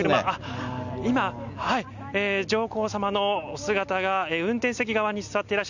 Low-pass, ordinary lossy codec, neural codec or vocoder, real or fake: 7.2 kHz; none; none; real